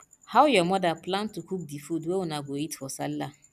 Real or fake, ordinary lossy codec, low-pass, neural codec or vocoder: real; none; 14.4 kHz; none